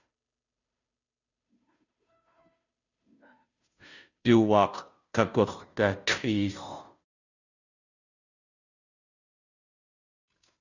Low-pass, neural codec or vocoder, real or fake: 7.2 kHz; codec, 16 kHz, 0.5 kbps, FunCodec, trained on Chinese and English, 25 frames a second; fake